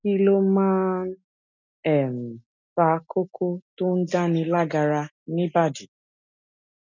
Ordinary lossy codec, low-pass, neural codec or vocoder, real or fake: none; 7.2 kHz; none; real